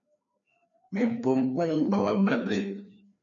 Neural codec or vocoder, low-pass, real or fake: codec, 16 kHz, 2 kbps, FreqCodec, larger model; 7.2 kHz; fake